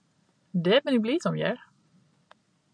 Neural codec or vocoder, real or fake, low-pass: none; real; 9.9 kHz